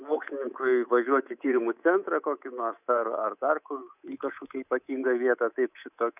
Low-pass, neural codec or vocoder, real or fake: 3.6 kHz; codec, 24 kHz, 3.1 kbps, DualCodec; fake